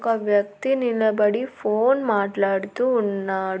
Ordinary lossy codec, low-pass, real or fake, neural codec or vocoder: none; none; real; none